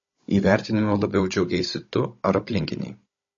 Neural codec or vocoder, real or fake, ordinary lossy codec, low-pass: codec, 16 kHz, 16 kbps, FunCodec, trained on Chinese and English, 50 frames a second; fake; MP3, 32 kbps; 7.2 kHz